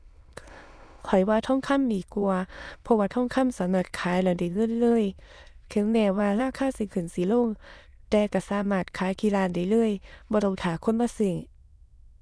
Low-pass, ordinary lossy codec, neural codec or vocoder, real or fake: none; none; autoencoder, 22.05 kHz, a latent of 192 numbers a frame, VITS, trained on many speakers; fake